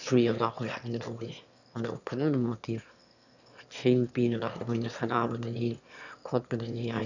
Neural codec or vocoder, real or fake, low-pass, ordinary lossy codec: autoencoder, 22.05 kHz, a latent of 192 numbers a frame, VITS, trained on one speaker; fake; 7.2 kHz; none